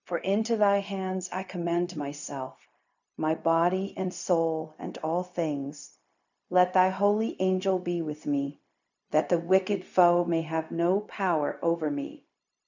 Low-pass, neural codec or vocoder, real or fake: 7.2 kHz; codec, 16 kHz, 0.4 kbps, LongCat-Audio-Codec; fake